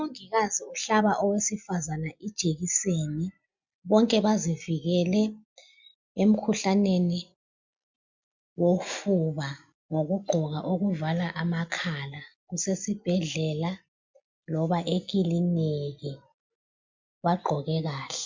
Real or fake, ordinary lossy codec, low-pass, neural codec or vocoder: real; MP3, 64 kbps; 7.2 kHz; none